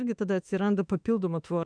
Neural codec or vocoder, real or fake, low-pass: autoencoder, 48 kHz, 32 numbers a frame, DAC-VAE, trained on Japanese speech; fake; 9.9 kHz